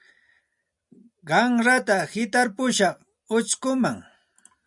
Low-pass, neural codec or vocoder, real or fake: 10.8 kHz; none; real